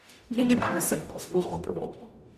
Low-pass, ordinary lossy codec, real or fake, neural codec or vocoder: 14.4 kHz; none; fake; codec, 44.1 kHz, 0.9 kbps, DAC